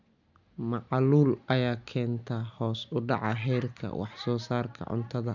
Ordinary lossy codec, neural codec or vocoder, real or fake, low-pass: none; none; real; 7.2 kHz